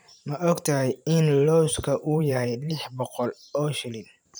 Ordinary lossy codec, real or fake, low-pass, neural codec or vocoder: none; fake; none; vocoder, 44.1 kHz, 128 mel bands every 512 samples, BigVGAN v2